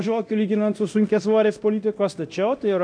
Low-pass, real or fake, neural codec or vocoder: 9.9 kHz; fake; codec, 24 kHz, 0.9 kbps, DualCodec